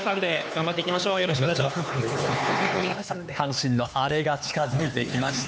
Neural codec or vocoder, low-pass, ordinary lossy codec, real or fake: codec, 16 kHz, 4 kbps, X-Codec, HuBERT features, trained on LibriSpeech; none; none; fake